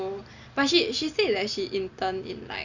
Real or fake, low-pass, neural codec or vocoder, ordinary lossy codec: real; 7.2 kHz; none; Opus, 64 kbps